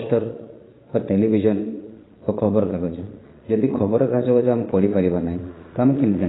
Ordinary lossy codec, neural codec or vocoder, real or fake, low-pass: AAC, 16 kbps; vocoder, 22.05 kHz, 80 mel bands, Vocos; fake; 7.2 kHz